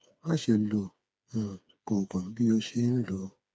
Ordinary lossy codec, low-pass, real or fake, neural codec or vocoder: none; none; fake; codec, 16 kHz, 4 kbps, FreqCodec, smaller model